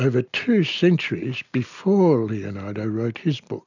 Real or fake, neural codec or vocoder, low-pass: real; none; 7.2 kHz